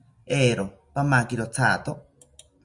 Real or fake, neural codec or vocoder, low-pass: real; none; 10.8 kHz